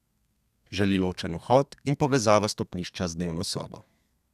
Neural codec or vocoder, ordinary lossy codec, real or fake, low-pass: codec, 32 kHz, 1.9 kbps, SNAC; none; fake; 14.4 kHz